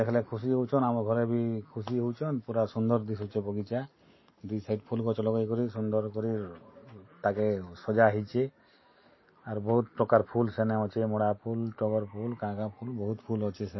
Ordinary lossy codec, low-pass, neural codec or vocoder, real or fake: MP3, 24 kbps; 7.2 kHz; none; real